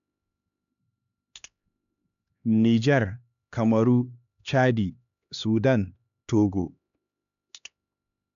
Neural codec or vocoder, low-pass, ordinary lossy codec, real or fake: codec, 16 kHz, 2 kbps, X-Codec, HuBERT features, trained on LibriSpeech; 7.2 kHz; none; fake